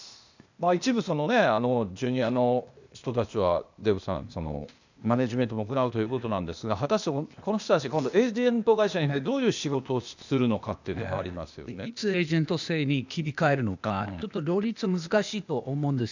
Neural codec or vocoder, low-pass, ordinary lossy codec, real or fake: codec, 16 kHz, 0.8 kbps, ZipCodec; 7.2 kHz; none; fake